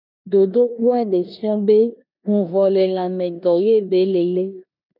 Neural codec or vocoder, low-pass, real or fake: codec, 16 kHz in and 24 kHz out, 0.9 kbps, LongCat-Audio-Codec, four codebook decoder; 5.4 kHz; fake